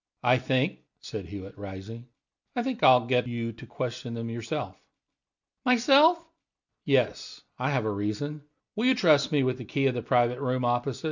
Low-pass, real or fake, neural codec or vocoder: 7.2 kHz; real; none